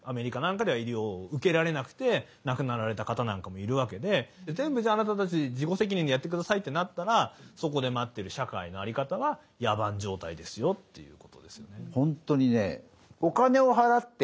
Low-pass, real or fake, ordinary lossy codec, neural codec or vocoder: none; real; none; none